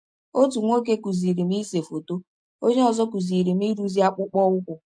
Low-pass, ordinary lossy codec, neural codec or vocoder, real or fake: 9.9 kHz; MP3, 64 kbps; none; real